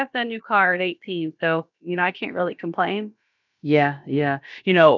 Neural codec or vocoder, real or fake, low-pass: codec, 16 kHz, about 1 kbps, DyCAST, with the encoder's durations; fake; 7.2 kHz